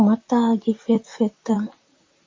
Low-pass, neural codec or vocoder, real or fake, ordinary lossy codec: 7.2 kHz; vocoder, 44.1 kHz, 128 mel bands, Pupu-Vocoder; fake; MP3, 48 kbps